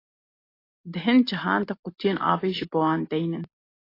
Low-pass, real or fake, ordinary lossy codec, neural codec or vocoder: 5.4 kHz; real; AAC, 24 kbps; none